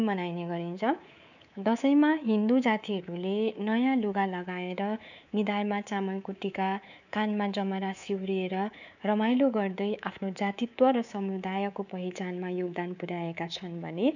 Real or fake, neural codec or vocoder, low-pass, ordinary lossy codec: fake; codec, 24 kHz, 3.1 kbps, DualCodec; 7.2 kHz; none